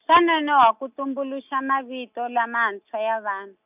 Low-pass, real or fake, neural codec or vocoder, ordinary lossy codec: 3.6 kHz; real; none; none